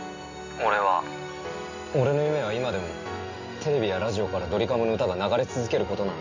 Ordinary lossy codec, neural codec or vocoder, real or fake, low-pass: AAC, 48 kbps; none; real; 7.2 kHz